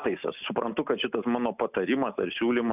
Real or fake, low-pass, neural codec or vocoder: real; 3.6 kHz; none